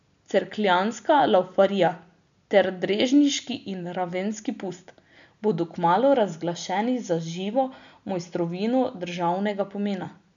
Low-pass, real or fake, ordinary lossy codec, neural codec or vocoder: 7.2 kHz; real; none; none